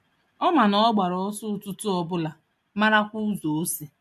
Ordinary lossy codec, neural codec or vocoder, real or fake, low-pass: MP3, 64 kbps; none; real; 14.4 kHz